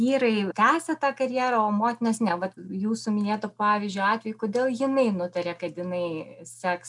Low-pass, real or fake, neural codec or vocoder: 10.8 kHz; real; none